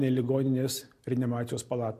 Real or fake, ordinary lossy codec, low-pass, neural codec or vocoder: real; MP3, 64 kbps; 14.4 kHz; none